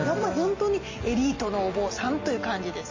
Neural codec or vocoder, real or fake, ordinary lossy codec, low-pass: none; real; MP3, 32 kbps; 7.2 kHz